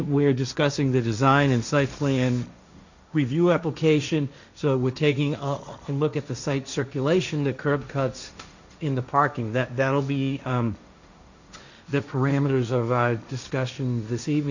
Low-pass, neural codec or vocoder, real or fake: 7.2 kHz; codec, 16 kHz, 1.1 kbps, Voila-Tokenizer; fake